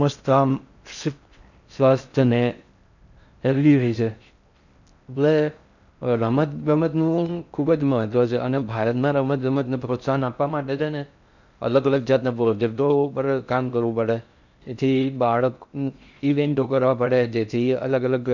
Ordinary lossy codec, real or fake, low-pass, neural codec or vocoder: none; fake; 7.2 kHz; codec, 16 kHz in and 24 kHz out, 0.6 kbps, FocalCodec, streaming, 4096 codes